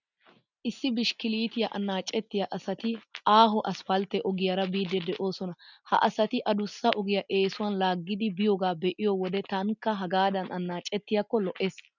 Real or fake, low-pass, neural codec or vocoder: real; 7.2 kHz; none